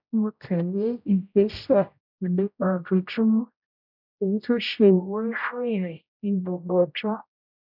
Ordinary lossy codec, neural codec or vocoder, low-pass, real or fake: none; codec, 16 kHz, 0.5 kbps, X-Codec, HuBERT features, trained on general audio; 5.4 kHz; fake